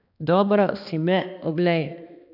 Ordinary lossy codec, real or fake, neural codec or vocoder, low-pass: none; fake; codec, 16 kHz, 2 kbps, X-Codec, HuBERT features, trained on balanced general audio; 5.4 kHz